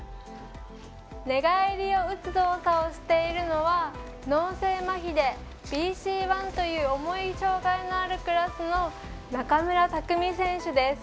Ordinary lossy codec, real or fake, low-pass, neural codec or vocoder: none; real; none; none